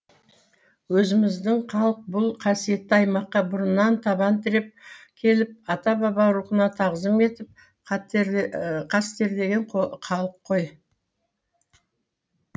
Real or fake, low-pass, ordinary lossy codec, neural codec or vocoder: real; none; none; none